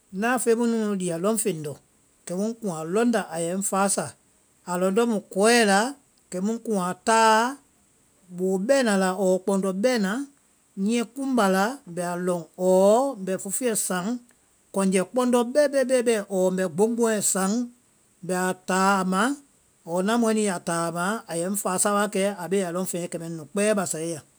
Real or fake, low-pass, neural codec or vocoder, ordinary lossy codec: real; none; none; none